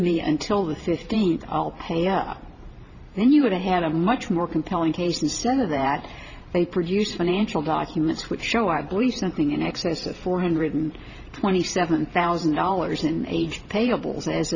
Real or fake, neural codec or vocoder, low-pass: fake; vocoder, 44.1 kHz, 128 mel bands every 512 samples, BigVGAN v2; 7.2 kHz